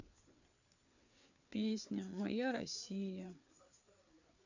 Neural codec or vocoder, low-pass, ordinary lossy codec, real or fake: codec, 16 kHz, 4 kbps, FreqCodec, larger model; 7.2 kHz; none; fake